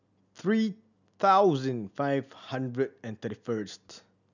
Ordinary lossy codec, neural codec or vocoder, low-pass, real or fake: none; none; 7.2 kHz; real